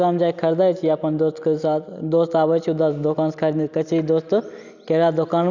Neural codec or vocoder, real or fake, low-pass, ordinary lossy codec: none; real; 7.2 kHz; none